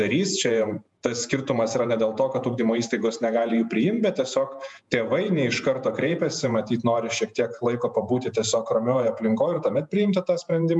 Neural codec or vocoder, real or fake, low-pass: vocoder, 48 kHz, 128 mel bands, Vocos; fake; 10.8 kHz